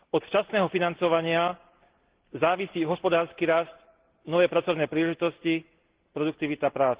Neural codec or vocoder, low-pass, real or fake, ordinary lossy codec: none; 3.6 kHz; real; Opus, 16 kbps